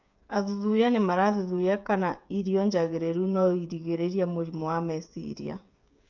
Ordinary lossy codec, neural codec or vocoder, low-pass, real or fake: none; codec, 16 kHz, 8 kbps, FreqCodec, smaller model; 7.2 kHz; fake